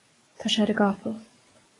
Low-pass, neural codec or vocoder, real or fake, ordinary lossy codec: 10.8 kHz; codec, 44.1 kHz, 7.8 kbps, DAC; fake; AAC, 32 kbps